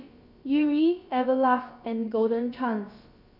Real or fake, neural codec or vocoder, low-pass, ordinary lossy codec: fake; codec, 16 kHz, about 1 kbps, DyCAST, with the encoder's durations; 5.4 kHz; none